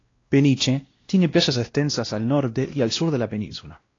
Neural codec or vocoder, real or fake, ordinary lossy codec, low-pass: codec, 16 kHz, 1 kbps, X-Codec, WavLM features, trained on Multilingual LibriSpeech; fake; AAC, 32 kbps; 7.2 kHz